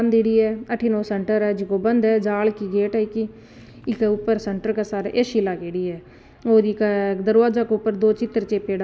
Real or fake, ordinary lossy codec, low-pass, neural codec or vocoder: real; none; none; none